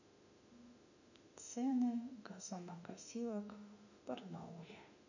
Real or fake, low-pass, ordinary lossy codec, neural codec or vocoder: fake; 7.2 kHz; none; autoencoder, 48 kHz, 32 numbers a frame, DAC-VAE, trained on Japanese speech